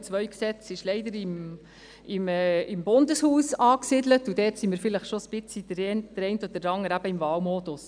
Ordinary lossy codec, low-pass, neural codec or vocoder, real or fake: none; 9.9 kHz; none; real